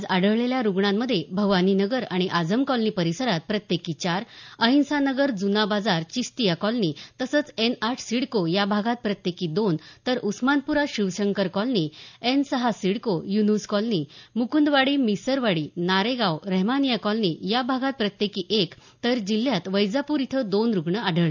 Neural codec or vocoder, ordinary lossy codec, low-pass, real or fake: none; none; 7.2 kHz; real